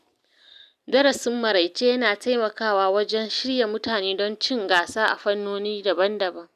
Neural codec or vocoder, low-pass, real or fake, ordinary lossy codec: none; 14.4 kHz; real; none